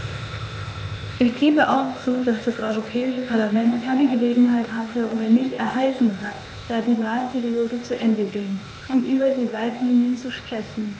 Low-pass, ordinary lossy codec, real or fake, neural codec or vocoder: none; none; fake; codec, 16 kHz, 0.8 kbps, ZipCodec